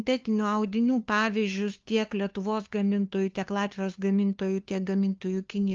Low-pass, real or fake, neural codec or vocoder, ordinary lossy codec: 7.2 kHz; fake; codec, 16 kHz, 2 kbps, FunCodec, trained on LibriTTS, 25 frames a second; Opus, 32 kbps